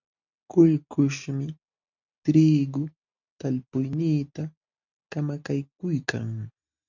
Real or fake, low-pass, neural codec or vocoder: real; 7.2 kHz; none